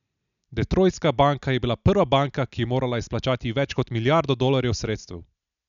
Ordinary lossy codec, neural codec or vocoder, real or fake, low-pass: none; none; real; 7.2 kHz